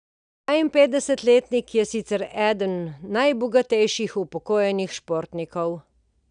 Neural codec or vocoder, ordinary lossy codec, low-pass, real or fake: none; none; 9.9 kHz; real